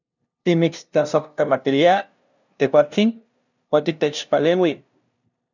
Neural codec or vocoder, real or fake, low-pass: codec, 16 kHz, 0.5 kbps, FunCodec, trained on LibriTTS, 25 frames a second; fake; 7.2 kHz